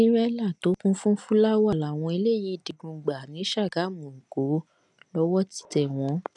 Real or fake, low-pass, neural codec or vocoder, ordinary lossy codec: real; 10.8 kHz; none; none